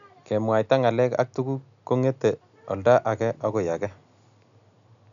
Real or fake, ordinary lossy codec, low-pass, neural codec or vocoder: real; none; 7.2 kHz; none